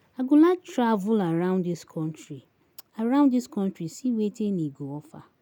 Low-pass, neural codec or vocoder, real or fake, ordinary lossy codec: 19.8 kHz; none; real; none